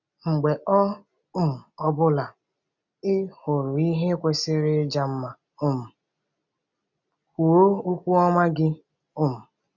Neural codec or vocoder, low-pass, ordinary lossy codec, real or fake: none; 7.2 kHz; none; real